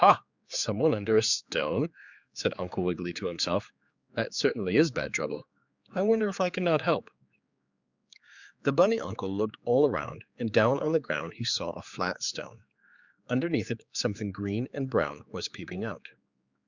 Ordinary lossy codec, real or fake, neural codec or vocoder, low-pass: Opus, 64 kbps; fake; codec, 16 kHz, 4 kbps, X-Codec, HuBERT features, trained on general audio; 7.2 kHz